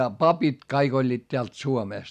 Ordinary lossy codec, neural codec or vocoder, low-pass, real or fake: none; none; 14.4 kHz; real